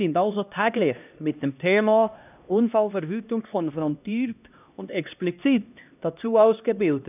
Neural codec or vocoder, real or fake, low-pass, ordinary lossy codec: codec, 16 kHz, 1 kbps, X-Codec, HuBERT features, trained on LibriSpeech; fake; 3.6 kHz; none